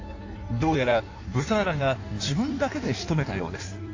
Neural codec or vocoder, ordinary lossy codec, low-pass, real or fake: codec, 16 kHz in and 24 kHz out, 1.1 kbps, FireRedTTS-2 codec; AAC, 48 kbps; 7.2 kHz; fake